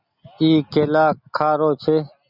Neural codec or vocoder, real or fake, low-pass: none; real; 5.4 kHz